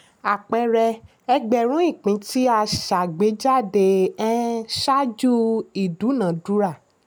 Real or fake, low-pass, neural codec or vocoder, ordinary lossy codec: real; none; none; none